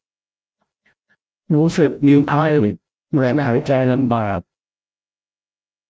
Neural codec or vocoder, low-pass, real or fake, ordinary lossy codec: codec, 16 kHz, 0.5 kbps, FreqCodec, larger model; none; fake; none